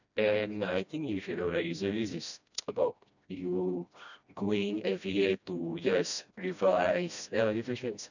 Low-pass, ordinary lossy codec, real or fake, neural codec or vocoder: 7.2 kHz; none; fake; codec, 16 kHz, 1 kbps, FreqCodec, smaller model